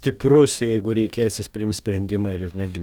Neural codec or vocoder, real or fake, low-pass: codec, 44.1 kHz, 2.6 kbps, DAC; fake; 19.8 kHz